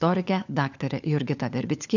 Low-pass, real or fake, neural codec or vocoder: 7.2 kHz; fake; codec, 16 kHz, 4 kbps, FunCodec, trained on LibriTTS, 50 frames a second